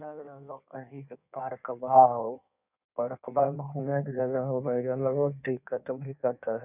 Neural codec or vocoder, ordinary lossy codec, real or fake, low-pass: codec, 16 kHz in and 24 kHz out, 1.1 kbps, FireRedTTS-2 codec; none; fake; 3.6 kHz